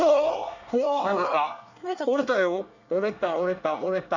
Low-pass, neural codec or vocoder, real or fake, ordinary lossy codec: 7.2 kHz; codec, 24 kHz, 1 kbps, SNAC; fake; none